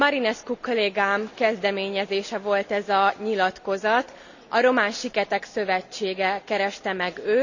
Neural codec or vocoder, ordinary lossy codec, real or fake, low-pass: none; none; real; 7.2 kHz